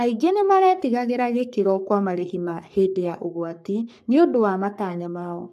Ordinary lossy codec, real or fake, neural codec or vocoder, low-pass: AAC, 96 kbps; fake; codec, 44.1 kHz, 3.4 kbps, Pupu-Codec; 14.4 kHz